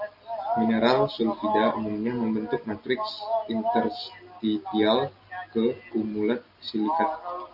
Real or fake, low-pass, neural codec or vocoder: real; 5.4 kHz; none